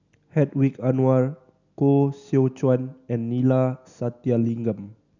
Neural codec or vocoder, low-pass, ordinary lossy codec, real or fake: none; 7.2 kHz; none; real